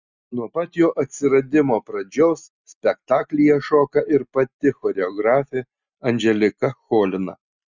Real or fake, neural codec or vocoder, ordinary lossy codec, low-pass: real; none; Opus, 64 kbps; 7.2 kHz